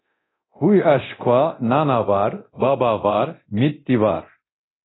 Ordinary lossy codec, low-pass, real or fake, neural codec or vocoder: AAC, 16 kbps; 7.2 kHz; fake; codec, 24 kHz, 0.9 kbps, DualCodec